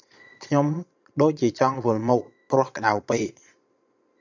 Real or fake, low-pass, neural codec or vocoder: fake; 7.2 kHz; vocoder, 22.05 kHz, 80 mel bands, Vocos